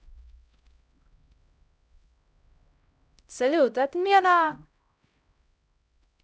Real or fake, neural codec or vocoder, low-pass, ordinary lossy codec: fake; codec, 16 kHz, 0.5 kbps, X-Codec, HuBERT features, trained on LibriSpeech; none; none